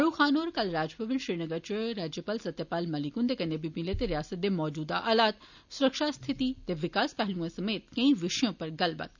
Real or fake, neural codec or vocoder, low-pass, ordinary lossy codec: real; none; 7.2 kHz; none